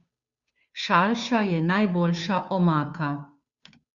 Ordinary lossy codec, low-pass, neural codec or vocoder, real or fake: Opus, 64 kbps; 7.2 kHz; codec, 16 kHz, 2 kbps, FunCodec, trained on Chinese and English, 25 frames a second; fake